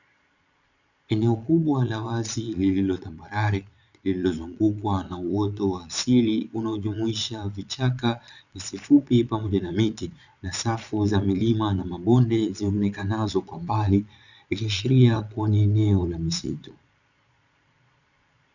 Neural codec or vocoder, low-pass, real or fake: vocoder, 22.05 kHz, 80 mel bands, Vocos; 7.2 kHz; fake